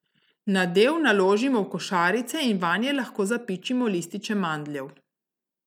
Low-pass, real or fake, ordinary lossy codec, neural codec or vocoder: 19.8 kHz; real; none; none